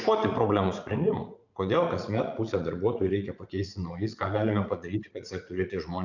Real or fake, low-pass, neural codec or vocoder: fake; 7.2 kHz; vocoder, 44.1 kHz, 80 mel bands, Vocos